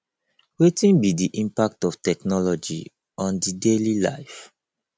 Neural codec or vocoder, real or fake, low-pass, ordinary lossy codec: none; real; none; none